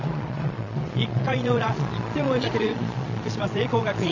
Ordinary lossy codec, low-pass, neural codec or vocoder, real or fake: AAC, 48 kbps; 7.2 kHz; vocoder, 22.05 kHz, 80 mel bands, Vocos; fake